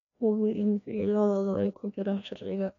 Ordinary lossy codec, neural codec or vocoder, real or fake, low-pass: none; codec, 16 kHz, 1 kbps, FreqCodec, larger model; fake; 7.2 kHz